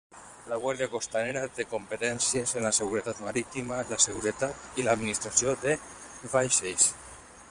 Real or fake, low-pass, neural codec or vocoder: fake; 9.9 kHz; vocoder, 22.05 kHz, 80 mel bands, Vocos